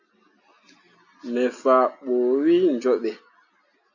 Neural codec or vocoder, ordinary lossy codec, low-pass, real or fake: none; AAC, 48 kbps; 7.2 kHz; real